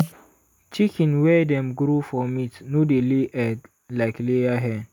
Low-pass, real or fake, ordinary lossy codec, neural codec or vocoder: 19.8 kHz; real; none; none